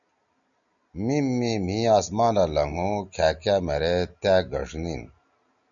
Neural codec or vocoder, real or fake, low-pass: none; real; 7.2 kHz